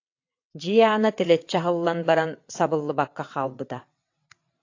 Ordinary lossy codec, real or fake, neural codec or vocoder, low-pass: AAC, 48 kbps; fake; vocoder, 22.05 kHz, 80 mel bands, WaveNeXt; 7.2 kHz